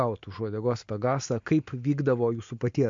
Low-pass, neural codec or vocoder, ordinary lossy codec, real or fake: 7.2 kHz; none; MP3, 48 kbps; real